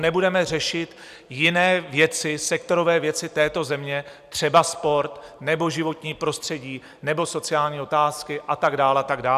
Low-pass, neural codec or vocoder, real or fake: 14.4 kHz; vocoder, 44.1 kHz, 128 mel bands every 256 samples, BigVGAN v2; fake